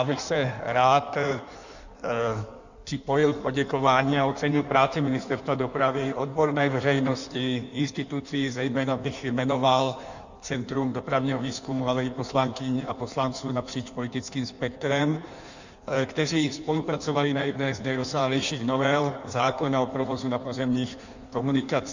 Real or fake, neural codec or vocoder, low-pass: fake; codec, 16 kHz in and 24 kHz out, 1.1 kbps, FireRedTTS-2 codec; 7.2 kHz